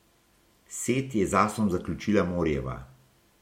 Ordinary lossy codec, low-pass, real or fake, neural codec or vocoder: MP3, 64 kbps; 19.8 kHz; real; none